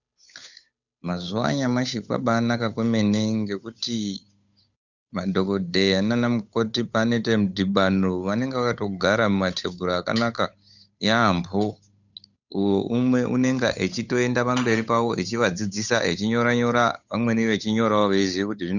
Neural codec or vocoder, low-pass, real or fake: codec, 16 kHz, 8 kbps, FunCodec, trained on Chinese and English, 25 frames a second; 7.2 kHz; fake